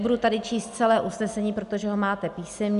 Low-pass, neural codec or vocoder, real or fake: 10.8 kHz; none; real